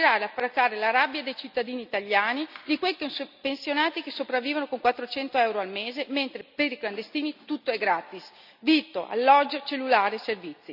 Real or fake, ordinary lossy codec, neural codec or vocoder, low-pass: real; none; none; 5.4 kHz